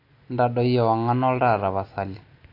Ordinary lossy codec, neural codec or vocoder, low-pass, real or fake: AAC, 32 kbps; none; 5.4 kHz; real